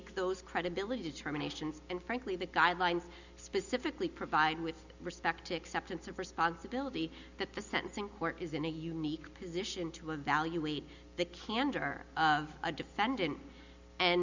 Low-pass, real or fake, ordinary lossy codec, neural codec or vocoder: 7.2 kHz; real; Opus, 64 kbps; none